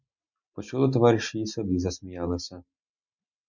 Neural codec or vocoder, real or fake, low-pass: none; real; 7.2 kHz